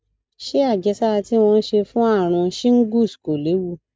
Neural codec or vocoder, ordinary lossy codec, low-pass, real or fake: none; none; none; real